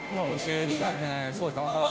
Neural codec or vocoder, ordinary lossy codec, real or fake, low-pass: codec, 16 kHz, 0.5 kbps, FunCodec, trained on Chinese and English, 25 frames a second; none; fake; none